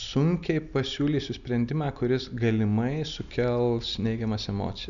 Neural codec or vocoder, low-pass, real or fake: none; 7.2 kHz; real